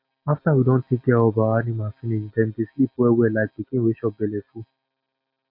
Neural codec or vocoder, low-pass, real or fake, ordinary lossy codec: none; 5.4 kHz; real; MP3, 32 kbps